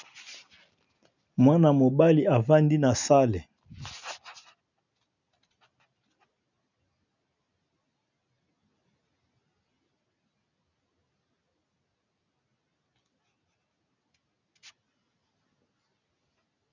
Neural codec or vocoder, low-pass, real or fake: none; 7.2 kHz; real